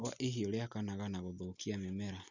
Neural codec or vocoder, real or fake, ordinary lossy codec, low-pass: none; real; none; 7.2 kHz